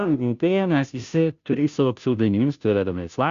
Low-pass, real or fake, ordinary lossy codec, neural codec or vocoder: 7.2 kHz; fake; Opus, 64 kbps; codec, 16 kHz, 0.5 kbps, FunCodec, trained on Chinese and English, 25 frames a second